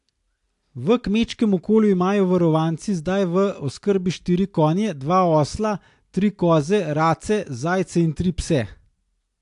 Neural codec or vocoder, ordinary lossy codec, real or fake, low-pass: none; AAC, 64 kbps; real; 10.8 kHz